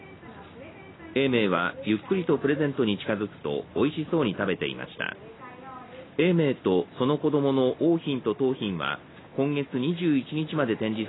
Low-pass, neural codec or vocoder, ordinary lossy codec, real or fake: 7.2 kHz; none; AAC, 16 kbps; real